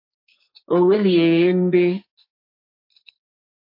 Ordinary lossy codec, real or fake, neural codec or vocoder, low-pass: MP3, 32 kbps; fake; codec, 44.1 kHz, 2.6 kbps, SNAC; 5.4 kHz